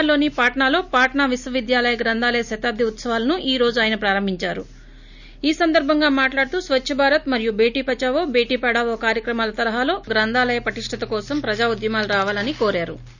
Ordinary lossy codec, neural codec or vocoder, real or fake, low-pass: none; none; real; 7.2 kHz